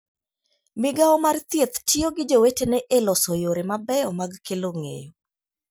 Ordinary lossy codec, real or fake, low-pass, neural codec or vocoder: none; real; none; none